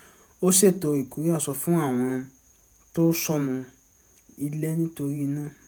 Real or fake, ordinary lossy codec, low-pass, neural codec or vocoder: fake; none; none; vocoder, 48 kHz, 128 mel bands, Vocos